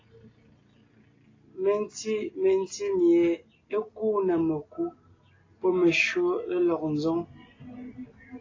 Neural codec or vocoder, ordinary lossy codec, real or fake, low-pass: none; AAC, 32 kbps; real; 7.2 kHz